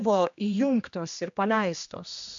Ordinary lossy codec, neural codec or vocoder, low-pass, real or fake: MP3, 64 kbps; codec, 16 kHz, 1 kbps, X-Codec, HuBERT features, trained on general audio; 7.2 kHz; fake